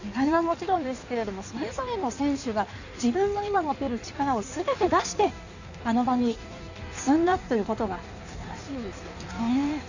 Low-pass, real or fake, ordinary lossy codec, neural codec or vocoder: 7.2 kHz; fake; none; codec, 16 kHz in and 24 kHz out, 1.1 kbps, FireRedTTS-2 codec